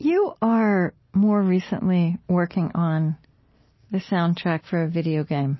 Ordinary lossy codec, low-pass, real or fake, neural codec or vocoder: MP3, 24 kbps; 7.2 kHz; real; none